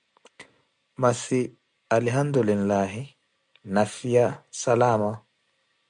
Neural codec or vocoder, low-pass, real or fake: none; 9.9 kHz; real